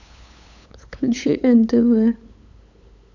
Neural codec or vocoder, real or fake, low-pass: codec, 16 kHz, 8 kbps, FunCodec, trained on LibriTTS, 25 frames a second; fake; 7.2 kHz